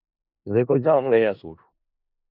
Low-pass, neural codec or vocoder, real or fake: 5.4 kHz; codec, 16 kHz in and 24 kHz out, 0.4 kbps, LongCat-Audio-Codec, four codebook decoder; fake